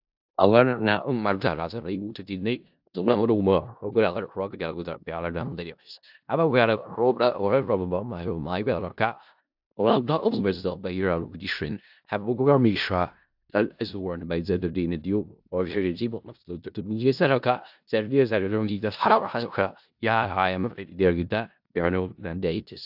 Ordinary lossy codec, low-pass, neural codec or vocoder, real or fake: AAC, 48 kbps; 5.4 kHz; codec, 16 kHz in and 24 kHz out, 0.4 kbps, LongCat-Audio-Codec, four codebook decoder; fake